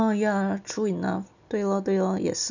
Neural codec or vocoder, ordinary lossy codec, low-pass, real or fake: none; none; 7.2 kHz; real